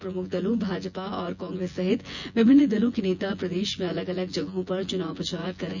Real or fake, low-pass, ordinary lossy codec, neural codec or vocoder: fake; 7.2 kHz; none; vocoder, 24 kHz, 100 mel bands, Vocos